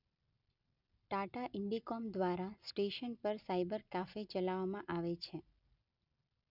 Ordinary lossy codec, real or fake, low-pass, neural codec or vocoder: none; real; 5.4 kHz; none